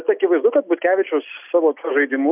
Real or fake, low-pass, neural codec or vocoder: real; 3.6 kHz; none